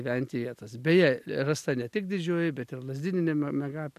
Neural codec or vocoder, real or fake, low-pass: none; real; 14.4 kHz